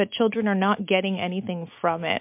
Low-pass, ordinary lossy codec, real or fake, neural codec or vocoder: 3.6 kHz; MP3, 32 kbps; real; none